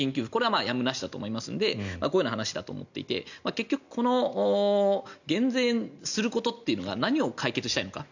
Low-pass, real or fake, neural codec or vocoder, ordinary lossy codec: 7.2 kHz; real; none; none